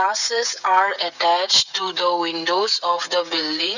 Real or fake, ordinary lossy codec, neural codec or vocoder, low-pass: fake; none; codec, 16 kHz, 16 kbps, FreqCodec, smaller model; 7.2 kHz